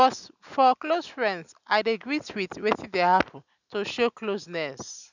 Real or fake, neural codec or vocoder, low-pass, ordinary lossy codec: real; none; 7.2 kHz; none